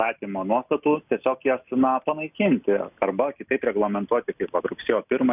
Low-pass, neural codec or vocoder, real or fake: 3.6 kHz; none; real